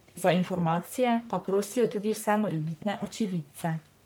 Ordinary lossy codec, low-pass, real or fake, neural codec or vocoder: none; none; fake; codec, 44.1 kHz, 1.7 kbps, Pupu-Codec